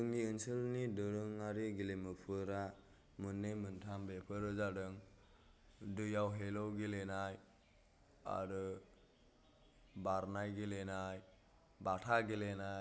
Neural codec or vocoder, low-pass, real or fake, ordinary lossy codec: none; none; real; none